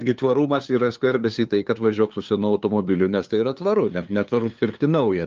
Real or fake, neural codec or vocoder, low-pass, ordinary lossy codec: fake; codec, 16 kHz, 2 kbps, FunCodec, trained on LibriTTS, 25 frames a second; 7.2 kHz; Opus, 32 kbps